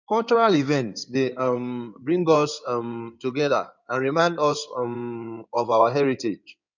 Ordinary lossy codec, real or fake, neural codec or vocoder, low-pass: none; fake; codec, 16 kHz in and 24 kHz out, 2.2 kbps, FireRedTTS-2 codec; 7.2 kHz